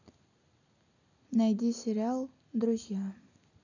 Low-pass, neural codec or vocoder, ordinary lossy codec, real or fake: 7.2 kHz; none; none; real